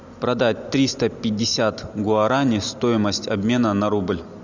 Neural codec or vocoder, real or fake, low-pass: none; real; 7.2 kHz